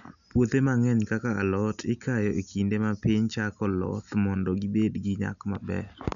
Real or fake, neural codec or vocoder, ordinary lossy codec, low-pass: real; none; none; 7.2 kHz